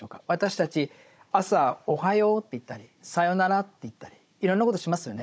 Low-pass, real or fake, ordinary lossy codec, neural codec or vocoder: none; fake; none; codec, 16 kHz, 16 kbps, FunCodec, trained on Chinese and English, 50 frames a second